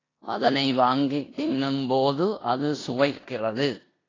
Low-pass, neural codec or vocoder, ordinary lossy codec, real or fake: 7.2 kHz; codec, 16 kHz in and 24 kHz out, 0.9 kbps, LongCat-Audio-Codec, four codebook decoder; AAC, 32 kbps; fake